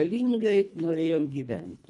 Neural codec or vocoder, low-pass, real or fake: codec, 24 kHz, 1.5 kbps, HILCodec; 10.8 kHz; fake